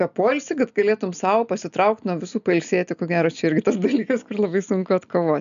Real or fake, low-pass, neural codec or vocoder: real; 7.2 kHz; none